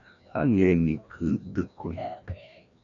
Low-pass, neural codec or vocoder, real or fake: 7.2 kHz; codec, 16 kHz, 1 kbps, FreqCodec, larger model; fake